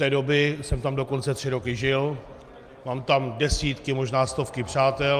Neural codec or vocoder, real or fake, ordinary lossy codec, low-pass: none; real; Opus, 32 kbps; 14.4 kHz